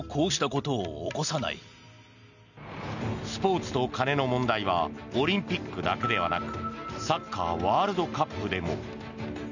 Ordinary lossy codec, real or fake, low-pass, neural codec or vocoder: none; real; 7.2 kHz; none